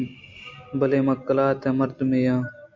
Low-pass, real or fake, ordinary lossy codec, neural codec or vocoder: 7.2 kHz; real; MP3, 64 kbps; none